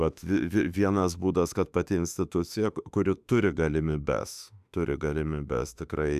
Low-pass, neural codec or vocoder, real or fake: 14.4 kHz; autoencoder, 48 kHz, 32 numbers a frame, DAC-VAE, trained on Japanese speech; fake